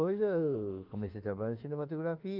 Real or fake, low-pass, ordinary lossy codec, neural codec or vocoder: fake; 5.4 kHz; Opus, 32 kbps; autoencoder, 48 kHz, 32 numbers a frame, DAC-VAE, trained on Japanese speech